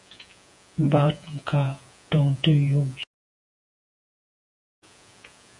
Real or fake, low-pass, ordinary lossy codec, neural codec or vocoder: fake; 10.8 kHz; MP3, 96 kbps; vocoder, 48 kHz, 128 mel bands, Vocos